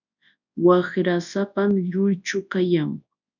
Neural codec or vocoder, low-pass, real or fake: codec, 24 kHz, 0.9 kbps, WavTokenizer, large speech release; 7.2 kHz; fake